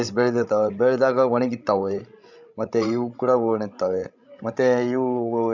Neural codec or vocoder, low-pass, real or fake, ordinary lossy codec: codec, 16 kHz, 16 kbps, FreqCodec, larger model; 7.2 kHz; fake; none